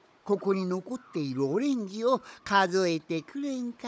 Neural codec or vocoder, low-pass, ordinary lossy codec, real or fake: codec, 16 kHz, 16 kbps, FunCodec, trained on Chinese and English, 50 frames a second; none; none; fake